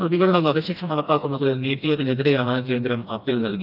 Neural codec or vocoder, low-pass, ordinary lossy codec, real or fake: codec, 16 kHz, 1 kbps, FreqCodec, smaller model; 5.4 kHz; none; fake